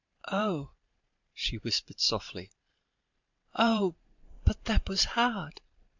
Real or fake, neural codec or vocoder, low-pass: fake; vocoder, 44.1 kHz, 128 mel bands every 512 samples, BigVGAN v2; 7.2 kHz